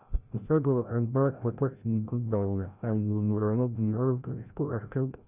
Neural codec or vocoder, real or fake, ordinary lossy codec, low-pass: codec, 16 kHz, 0.5 kbps, FreqCodec, larger model; fake; Opus, 64 kbps; 3.6 kHz